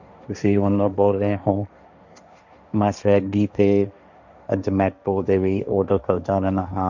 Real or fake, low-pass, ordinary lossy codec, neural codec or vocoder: fake; 7.2 kHz; none; codec, 16 kHz, 1.1 kbps, Voila-Tokenizer